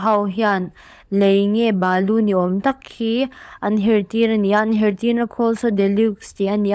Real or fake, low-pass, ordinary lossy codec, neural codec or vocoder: fake; none; none; codec, 16 kHz, 16 kbps, FunCodec, trained on LibriTTS, 50 frames a second